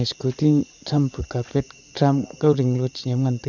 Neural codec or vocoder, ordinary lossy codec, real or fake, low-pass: vocoder, 44.1 kHz, 80 mel bands, Vocos; none; fake; 7.2 kHz